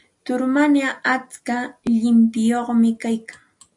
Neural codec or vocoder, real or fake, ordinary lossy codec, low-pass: none; real; AAC, 64 kbps; 10.8 kHz